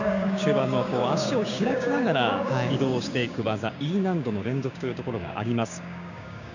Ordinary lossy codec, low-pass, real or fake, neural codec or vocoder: none; 7.2 kHz; fake; codec, 16 kHz, 6 kbps, DAC